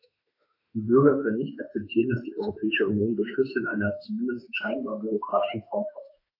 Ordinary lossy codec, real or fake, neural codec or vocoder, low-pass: AAC, 32 kbps; fake; autoencoder, 48 kHz, 32 numbers a frame, DAC-VAE, trained on Japanese speech; 5.4 kHz